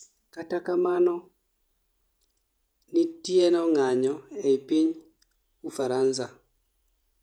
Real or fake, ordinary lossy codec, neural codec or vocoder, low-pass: fake; none; vocoder, 48 kHz, 128 mel bands, Vocos; 19.8 kHz